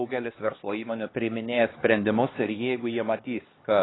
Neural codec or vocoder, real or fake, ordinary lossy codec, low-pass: codec, 16 kHz, 2 kbps, X-Codec, HuBERT features, trained on LibriSpeech; fake; AAC, 16 kbps; 7.2 kHz